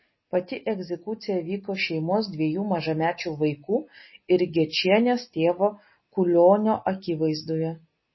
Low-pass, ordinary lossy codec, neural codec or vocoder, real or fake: 7.2 kHz; MP3, 24 kbps; none; real